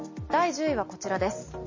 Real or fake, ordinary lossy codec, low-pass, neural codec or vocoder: real; MP3, 32 kbps; 7.2 kHz; none